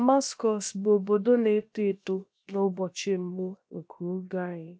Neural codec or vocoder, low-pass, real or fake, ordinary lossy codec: codec, 16 kHz, about 1 kbps, DyCAST, with the encoder's durations; none; fake; none